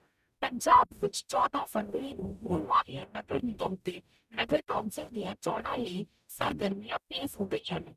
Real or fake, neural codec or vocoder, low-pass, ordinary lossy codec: fake; codec, 44.1 kHz, 0.9 kbps, DAC; 14.4 kHz; none